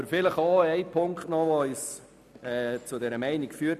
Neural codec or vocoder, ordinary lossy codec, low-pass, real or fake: none; none; 14.4 kHz; real